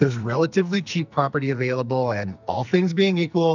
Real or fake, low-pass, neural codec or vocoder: fake; 7.2 kHz; codec, 44.1 kHz, 2.6 kbps, SNAC